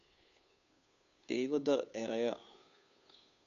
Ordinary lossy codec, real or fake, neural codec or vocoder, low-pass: none; fake; codec, 16 kHz, 2 kbps, FunCodec, trained on Chinese and English, 25 frames a second; 7.2 kHz